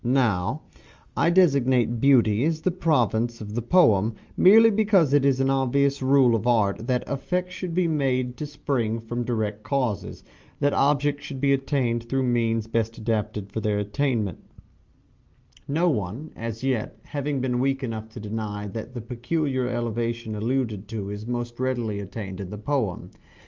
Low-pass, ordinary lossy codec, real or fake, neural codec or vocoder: 7.2 kHz; Opus, 32 kbps; real; none